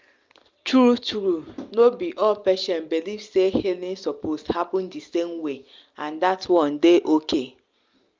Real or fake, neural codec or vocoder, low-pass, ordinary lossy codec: real; none; 7.2 kHz; Opus, 32 kbps